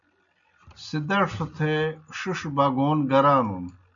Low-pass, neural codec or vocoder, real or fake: 7.2 kHz; none; real